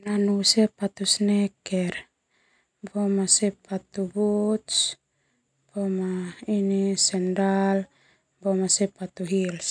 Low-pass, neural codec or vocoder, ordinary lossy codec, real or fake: 9.9 kHz; none; none; real